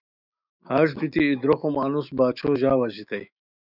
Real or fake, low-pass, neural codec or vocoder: fake; 5.4 kHz; autoencoder, 48 kHz, 128 numbers a frame, DAC-VAE, trained on Japanese speech